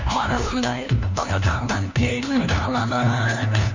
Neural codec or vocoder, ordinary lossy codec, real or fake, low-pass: codec, 16 kHz, 1 kbps, FunCodec, trained on LibriTTS, 50 frames a second; Opus, 64 kbps; fake; 7.2 kHz